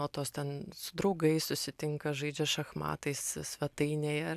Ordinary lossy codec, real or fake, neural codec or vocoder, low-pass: AAC, 96 kbps; real; none; 14.4 kHz